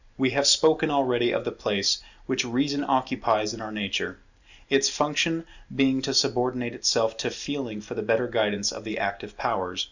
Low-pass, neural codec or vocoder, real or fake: 7.2 kHz; none; real